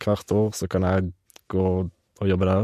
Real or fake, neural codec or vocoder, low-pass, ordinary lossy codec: real; none; 14.4 kHz; MP3, 64 kbps